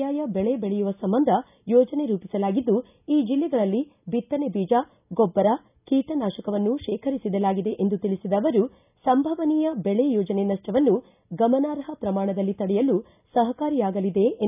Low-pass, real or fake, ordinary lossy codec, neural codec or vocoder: 3.6 kHz; real; none; none